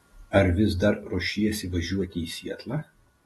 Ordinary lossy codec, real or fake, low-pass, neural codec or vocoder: AAC, 32 kbps; real; 19.8 kHz; none